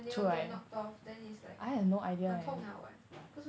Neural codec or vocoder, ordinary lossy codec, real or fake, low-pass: none; none; real; none